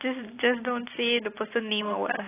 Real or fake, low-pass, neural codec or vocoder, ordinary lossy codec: real; 3.6 kHz; none; AAC, 16 kbps